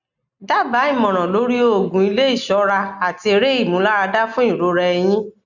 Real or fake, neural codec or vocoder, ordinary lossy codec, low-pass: real; none; none; 7.2 kHz